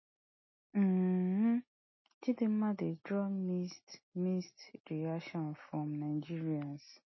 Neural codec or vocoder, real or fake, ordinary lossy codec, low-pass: none; real; MP3, 24 kbps; 7.2 kHz